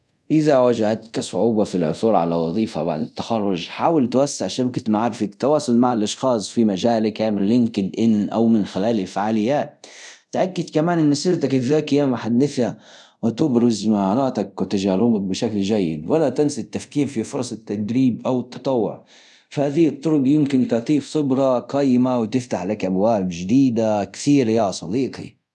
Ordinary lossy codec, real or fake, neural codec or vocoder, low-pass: none; fake; codec, 24 kHz, 0.5 kbps, DualCodec; none